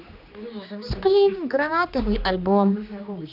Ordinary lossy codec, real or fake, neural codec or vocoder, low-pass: AAC, 48 kbps; fake; codec, 16 kHz, 2 kbps, X-Codec, HuBERT features, trained on general audio; 5.4 kHz